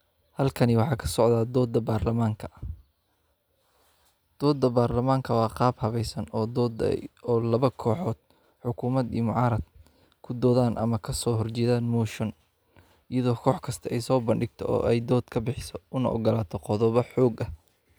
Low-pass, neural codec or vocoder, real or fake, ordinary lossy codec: none; none; real; none